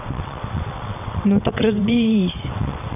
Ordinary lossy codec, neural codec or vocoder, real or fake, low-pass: none; vocoder, 22.05 kHz, 80 mel bands, WaveNeXt; fake; 3.6 kHz